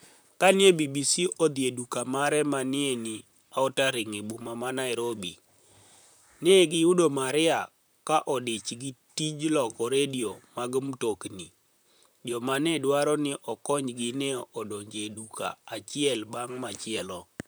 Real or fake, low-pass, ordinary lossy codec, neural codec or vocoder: fake; none; none; vocoder, 44.1 kHz, 128 mel bands, Pupu-Vocoder